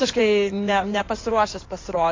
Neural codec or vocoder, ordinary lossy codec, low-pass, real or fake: codec, 16 kHz in and 24 kHz out, 2.2 kbps, FireRedTTS-2 codec; AAC, 32 kbps; 7.2 kHz; fake